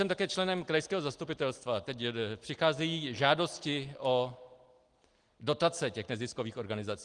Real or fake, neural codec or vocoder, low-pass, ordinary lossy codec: real; none; 9.9 kHz; Opus, 24 kbps